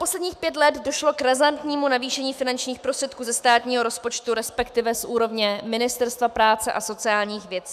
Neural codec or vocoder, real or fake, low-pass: autoencoder, 48 kHz, 128 numbers a frame, DAC-VAE, trained on Japanese speech; fake; 14.4 kHz